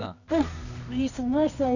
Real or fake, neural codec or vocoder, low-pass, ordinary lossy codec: fake; codec, 24 kHz, 0.9 kbps, WavTokenizer, medium music audio release; 7.2 kHz; none